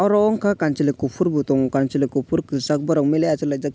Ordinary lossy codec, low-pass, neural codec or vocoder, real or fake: none; none; none; real